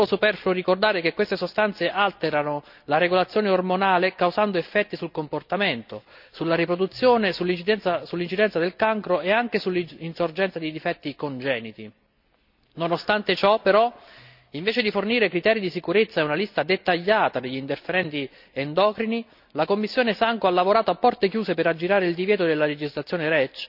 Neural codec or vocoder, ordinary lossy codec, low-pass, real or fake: none; none; 5.4 kHz; real